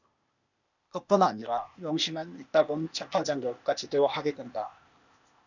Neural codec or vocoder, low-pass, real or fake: codec, 16 kHz, 0.8 kbps, ZipCodec; 7.2 kHz; fake